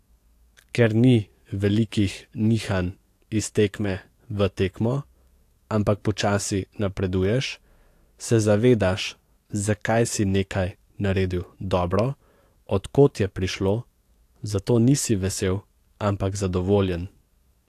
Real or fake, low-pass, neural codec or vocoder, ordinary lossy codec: fake; 14.4 kHz; codec, 44.1 kHz, 7.8 kbps, DAC; AAC, 64 kbps